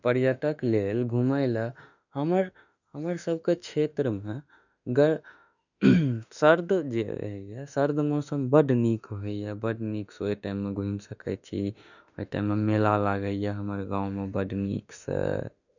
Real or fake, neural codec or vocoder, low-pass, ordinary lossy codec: fake; autoencoder, 48 kHz, 32 numbers a frame, DAC-VAE, trained on Japanese speech; 7.2 kHz; none